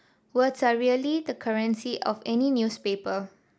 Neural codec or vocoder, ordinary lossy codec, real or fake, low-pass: none; none; real; none